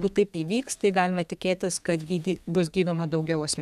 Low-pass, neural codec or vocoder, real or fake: 14.4 kHz; codec, 32 kHz, 1.9 kbps, SNAC; fake